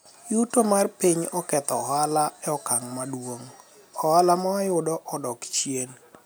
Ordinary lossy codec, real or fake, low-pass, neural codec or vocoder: none; real; none; none